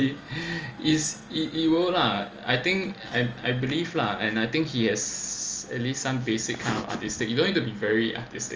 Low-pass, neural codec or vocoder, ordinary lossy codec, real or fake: 7.2 kHz; none; Opus, 24 kbps; real